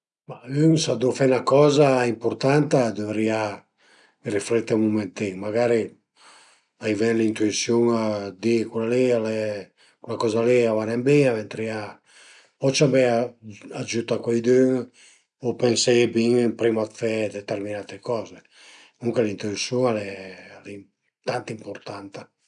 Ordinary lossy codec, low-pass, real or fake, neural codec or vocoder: none; 9.9 kHz; real; none